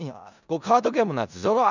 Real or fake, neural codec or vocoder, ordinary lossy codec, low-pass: fake; codec, 16 kHz in and 24 kHz out, 0.4 kbps, LongCat-Audio-Codec, four codebook decoder; none; 7.2 kHz